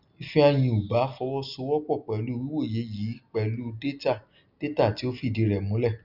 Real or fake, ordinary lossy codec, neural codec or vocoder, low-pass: real; none; none; 5.4 kHz